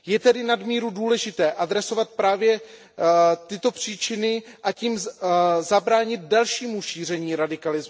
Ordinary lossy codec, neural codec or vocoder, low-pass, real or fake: none; none; none; real